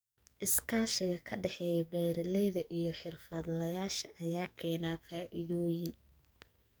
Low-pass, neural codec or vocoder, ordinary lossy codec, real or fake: none; codec, 44.1 kHz, 2.6 kbps, SNAC; none; fake